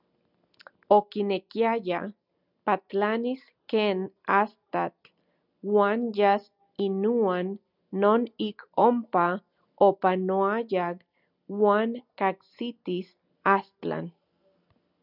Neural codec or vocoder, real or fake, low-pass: none; real; 5.4 kHz